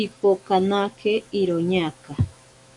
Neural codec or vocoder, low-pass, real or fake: autoencoder, 48 kHz, 128 numbers a frame, DAC-VAE, trained on Japanese speech; 10.8 kHz; fake